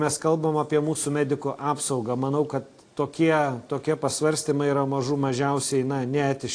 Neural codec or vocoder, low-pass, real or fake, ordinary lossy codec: none; 9.9 kHz; real; AAC, 48 kbps